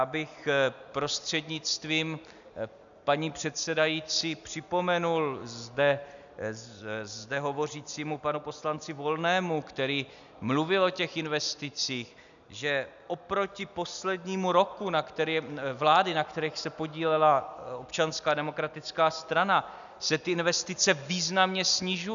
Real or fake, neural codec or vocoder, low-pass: real; none; 7.2 kHz